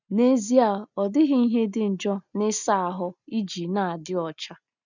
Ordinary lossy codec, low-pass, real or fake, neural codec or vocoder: none; 7.2 kHz; real; none